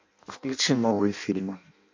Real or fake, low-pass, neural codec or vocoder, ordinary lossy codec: fake; 7.2 kHz; codec, 16 kHz in and 24 kHz out, 0.6 kbps, FireRedTTS-2 codec; MP3, 48 kbps